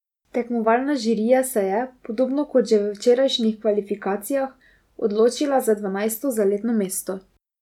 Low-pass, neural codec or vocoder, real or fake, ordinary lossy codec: 19.8 kHz; none; real; none